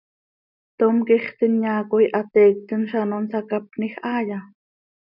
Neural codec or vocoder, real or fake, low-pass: none; real; 5.4 kHz